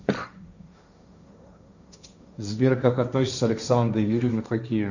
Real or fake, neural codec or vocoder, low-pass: fake; codec, 16 kHz, 1.1 kbps, Voila-Tokenizer; 7.2 kHz